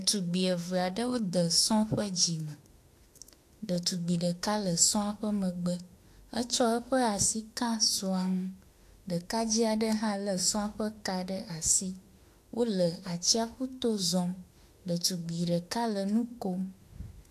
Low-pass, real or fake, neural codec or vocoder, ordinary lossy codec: 14.4 kHz; fake; autoencoder, 48 kHz, 32 numbers a frame, DAC-VAE, trained on Japanese speech; AAC, 64 kbps